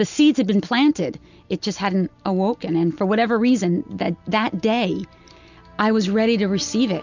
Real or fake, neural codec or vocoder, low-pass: real; none; 7.2 kHz